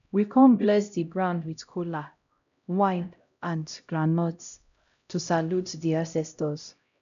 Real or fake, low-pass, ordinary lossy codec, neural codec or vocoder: fake; 7.2 kHz; none; codec, 16 kHz, 0.5 kbps, X-Codec, HuBERT features, trained on LibriSpeech